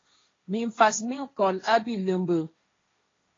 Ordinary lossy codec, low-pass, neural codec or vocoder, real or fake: AAC, 32 kbps; 7.2 kHz; codec, 16 kHz, 1.1 kbps, Voila-Tokenizer; fake